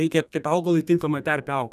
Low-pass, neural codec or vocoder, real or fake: 14.4 kHz; codec, 32 kHz, 1.9 kbps, SNAC; fake